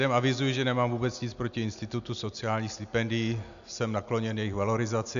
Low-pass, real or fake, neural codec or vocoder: 7.2 kHz; real; none